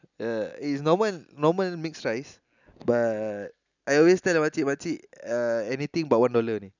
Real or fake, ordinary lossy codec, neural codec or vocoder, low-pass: real; none; none; 7.2 kHz